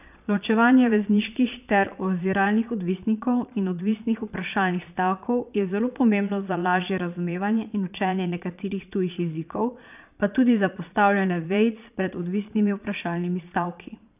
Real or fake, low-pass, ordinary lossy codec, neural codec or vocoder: fake; 3.6 kHz; none; vocoder, 22.05 kHz, 80 mel bands, Vocos